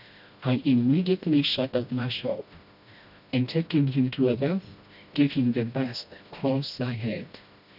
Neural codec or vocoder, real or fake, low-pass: codec, 16 kHz, 1 kbps, FreqCodec, smaller model; fake; 5.4 kHz